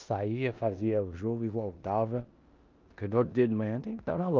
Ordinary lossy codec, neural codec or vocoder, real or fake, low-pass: Opus, 24 kbps; codec, 16 kHz in and 24 kHz out, 0.9 kbps, LongCat-Audio-Codec, four codebook decoder; fake; 7.2 kHz